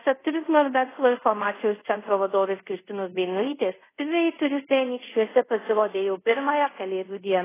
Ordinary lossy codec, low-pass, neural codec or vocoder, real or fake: AAC, 16 kbps; 3.6 kHz; codec, 24 kHz, 0.5 kbps, DualCodec; fake